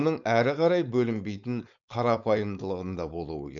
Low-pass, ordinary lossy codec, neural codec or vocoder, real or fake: 7.2 kHz; none; codec, 16 kHz, 4.8 kbps, FACodec; fake